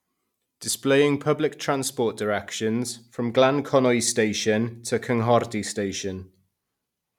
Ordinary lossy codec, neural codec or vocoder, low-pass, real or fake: none; none; 19.8 kHz; real